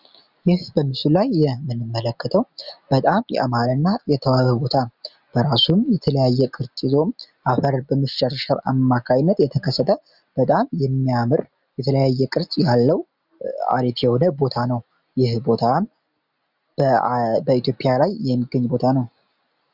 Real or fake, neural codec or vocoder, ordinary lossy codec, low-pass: real; none; Opus, 64 kbps; 5.4 kHz